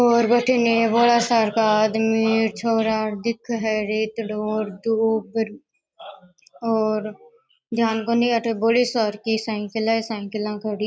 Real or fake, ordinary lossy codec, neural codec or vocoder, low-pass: real; none; none; none